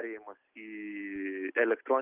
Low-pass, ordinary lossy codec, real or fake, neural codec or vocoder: 3.6 kHz; Opus, 32 kbps; real; none